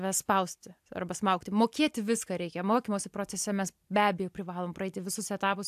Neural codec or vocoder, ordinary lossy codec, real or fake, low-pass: none; AAC, 96 kbps; real; 14.4 kHz